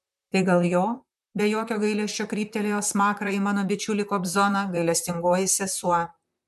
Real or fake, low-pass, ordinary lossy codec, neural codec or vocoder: fake; 14.4 kHz; MP3, 96 kbps; vocoder, 44.1 kHz, 128 mel bands, Pupu-Vocoder